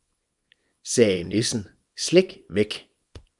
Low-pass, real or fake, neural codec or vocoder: 10.8 kHz; fake; codec, 24 kHz, 0.9 kbps, WavTokenizer, small release